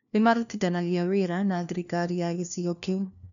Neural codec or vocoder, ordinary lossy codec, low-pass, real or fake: codec, 16 kHz, 1 kbps, FunCodec, trained on LibriTTS, 50 frames a second; none; 7.2 kHz; fake